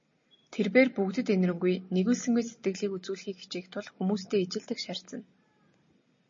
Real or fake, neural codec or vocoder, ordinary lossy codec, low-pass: real; none; MP3, 32 kbps; 7.2 kHz